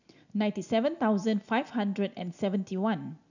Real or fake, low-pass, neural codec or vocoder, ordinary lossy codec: real; 7.2 kHz; none; none